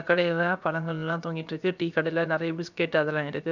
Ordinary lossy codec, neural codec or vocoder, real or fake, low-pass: none; codec, 16 kHz, about 1 kbps, DyCAST, with the encoder's durations; fake; 7.2 kHz